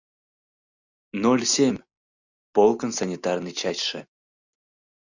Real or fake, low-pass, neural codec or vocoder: real; 7.2 kHz; none